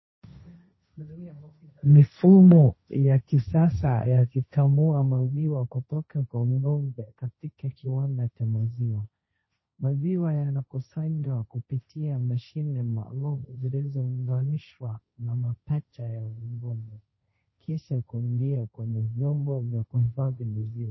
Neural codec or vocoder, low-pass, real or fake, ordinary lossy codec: codec, 16 kHz, 1.1 kbps, Voila-Tokenizer; 7.2 kHz; fake; MP3, 24 kbps